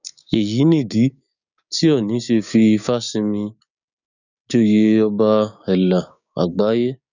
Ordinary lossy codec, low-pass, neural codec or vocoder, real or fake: none; 7.2 kHz; codec, 16 kHz, 6 kbps, DAC; fake